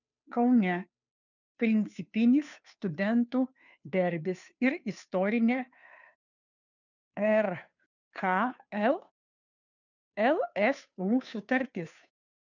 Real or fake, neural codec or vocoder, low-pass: fake; codec, 16 kHz, 2 kbps, FunCodec, trained on Chinese and English, 25 frames a second; 7.2 kHz